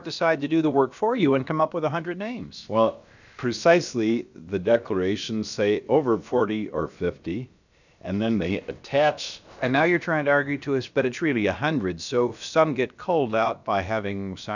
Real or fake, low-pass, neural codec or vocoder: fake; 7.2 kHz; codec, 16 kHz, about 1 kbps, DyCAST, with the encoder's durations